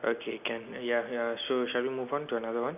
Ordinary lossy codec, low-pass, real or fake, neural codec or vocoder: none; 3.6 kHz; real; none